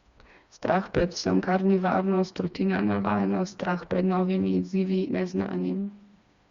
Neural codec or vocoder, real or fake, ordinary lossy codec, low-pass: codec, 16 kHz, 2 kbps, FreqCodec, smaller model; fake; Opus, 64 kbps; 7.2 kHz